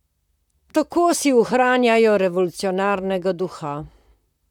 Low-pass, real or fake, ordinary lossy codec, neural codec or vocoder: 19.8 kHz; fake; none; vocoder, 44.1 kHz, 128 mel bands, Pupu-Vocoder